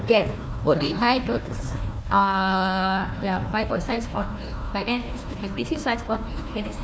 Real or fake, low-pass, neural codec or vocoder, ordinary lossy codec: fake; none; codec, 16 kHz, 1 kbps, FunCodec, trained on Chinese and English, 50 frames a second; none